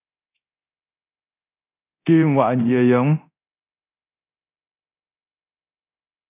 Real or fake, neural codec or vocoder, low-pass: fake; codec, 24 kHz, 0.9 kbps, DualCodec; 3.6 kHz